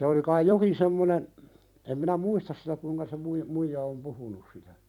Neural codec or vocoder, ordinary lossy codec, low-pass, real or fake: vocoder, 44.1 kHz, 128 mel bands every 256 samples, BigVGAN v2; none; 19.8 kHz; fake